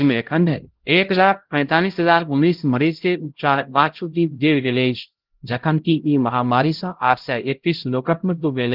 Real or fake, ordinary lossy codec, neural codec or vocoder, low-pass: fake; Opus, 16 kbps; codec, 16 kHz, 0.5 kbps, X-Codec, HuBERT features, trained on LibriSpeech; 5.4 kHz